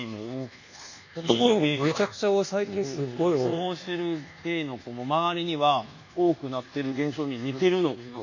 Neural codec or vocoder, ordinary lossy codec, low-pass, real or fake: codec, 24 kHz, 1.2 kbps, DualCodec; none; 7.2 kHz; fake